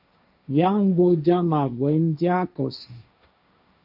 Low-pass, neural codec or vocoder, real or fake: 5.4 kHz; codec, 16 kHz, 1.1 kbps, Voila-Tokenizer; fake